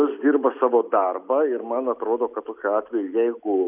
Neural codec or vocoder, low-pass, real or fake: none; 3.6 kHz; real